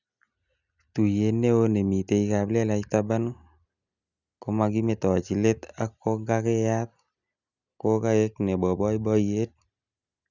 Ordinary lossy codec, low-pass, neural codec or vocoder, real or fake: none; 7.2 kHz; none; real